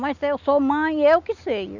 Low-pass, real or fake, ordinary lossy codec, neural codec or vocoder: 7.2 kHz; real; none; none